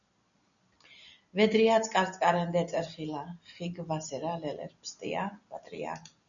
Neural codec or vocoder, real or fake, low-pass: none; real; 7.2 kHz